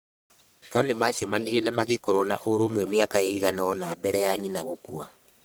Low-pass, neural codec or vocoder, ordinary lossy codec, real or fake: none; codec, 44.1 kHz, 1.7 kbps, Pupu-Codec; none; fake